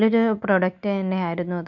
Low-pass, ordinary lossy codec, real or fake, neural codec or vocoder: 7.2 kHz; none; real; none